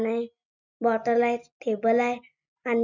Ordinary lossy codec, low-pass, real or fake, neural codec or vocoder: none; 7.2 kHz; real; none